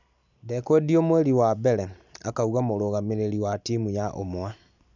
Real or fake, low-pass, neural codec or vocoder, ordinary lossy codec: real; 7.2 kHz; none; none